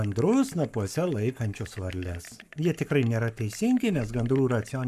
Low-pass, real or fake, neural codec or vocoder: 14.4 kHz; fake; codec, 44.1 kHz, 7.8 kbps, Pupu-Codec